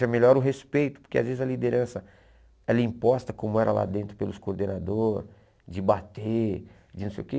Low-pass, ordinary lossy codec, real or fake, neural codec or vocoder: none; none; real; none